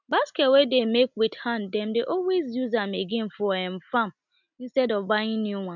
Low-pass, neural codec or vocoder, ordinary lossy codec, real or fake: 7.2 kHz; none; none; real